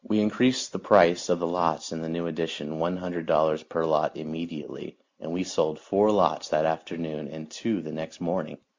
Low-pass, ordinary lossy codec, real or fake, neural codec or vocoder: 7.2 kHz; MP3, 64 kbps; real; none